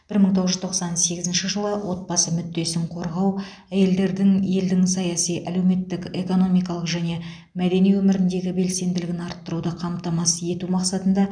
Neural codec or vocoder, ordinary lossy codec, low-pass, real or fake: none; none; 9.9 kHz; real